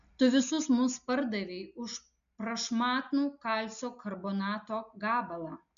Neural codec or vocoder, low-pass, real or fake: none; 7.2 kHz; real